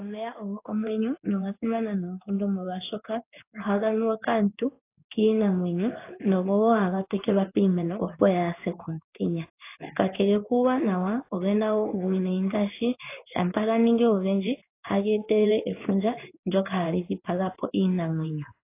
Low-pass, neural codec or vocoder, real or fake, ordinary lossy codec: 3.6 kHz; codec, 16 kHz in and 24 kHz out, 1 kbps, XY-Tokenizer; fake; AAC, 24 kbps